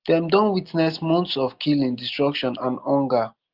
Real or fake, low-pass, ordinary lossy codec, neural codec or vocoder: real; 5.4 kHz; Opus, 32 kbps; none